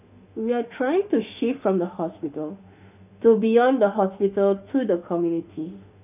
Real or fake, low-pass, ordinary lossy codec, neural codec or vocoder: fake; 3.6 kHz; none; autoencoder, 48 kHz, 32 numbers a frame, DAC-VAE, trained on Japanese speech